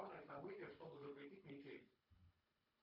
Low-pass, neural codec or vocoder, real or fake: 5.4 kHz; codec, 24 kHz, 3 kbps, HILCodec; fake